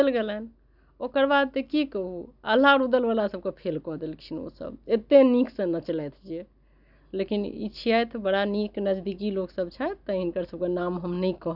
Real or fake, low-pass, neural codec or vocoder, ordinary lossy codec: real; 5.4 kHz; none; none